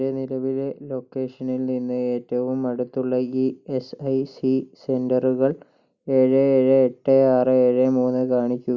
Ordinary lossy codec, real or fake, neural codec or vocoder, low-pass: none; real; none; 7.2 kHz